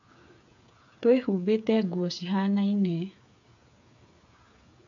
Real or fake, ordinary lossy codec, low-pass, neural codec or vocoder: fake; none; 7.2 kHz; codec, 16 kHz, 8 kbps, FreqCodec, smaller model